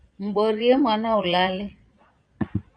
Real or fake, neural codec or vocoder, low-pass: fake; vocoder, 22.05 kHz, 80 mel bands, Vocos; 9.9 kHz